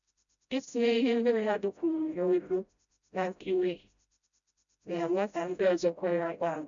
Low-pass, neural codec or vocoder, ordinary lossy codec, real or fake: 7.2 kHz; codec, 16 kHz, 0.5 kbps, FreqCodec, smaller model; none; fake